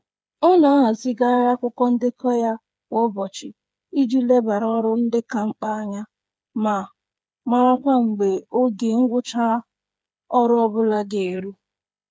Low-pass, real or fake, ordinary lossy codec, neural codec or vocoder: none; fake; none; codec, 16 kHz, 8 kbps, FreqCodec, smaller model